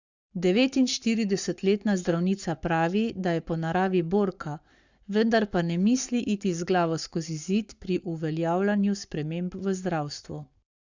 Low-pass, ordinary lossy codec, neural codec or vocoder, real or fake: 7.2 kHz; Opus, 64 kbps; codec, 44.1 kHz, 7.8 kbps, Pupu-Codec; fake